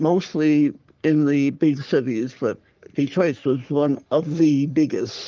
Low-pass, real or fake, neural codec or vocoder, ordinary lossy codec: 7.2 kHz; fake; codec, 44.1 kHz, 3.4 kbps, Pupu-Codec; Opus, 32 kbps